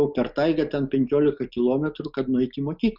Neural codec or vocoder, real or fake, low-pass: none; real; 5.4 kHz